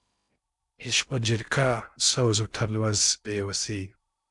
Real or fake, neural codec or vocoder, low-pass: fake; codec, 16 kHz in and 24 kHz out, 0.6 kbps, FocalCodec, streaming, 2048 codes; 10.8 kHz